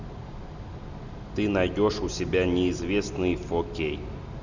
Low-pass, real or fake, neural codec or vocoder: 7.2 kHz; real; none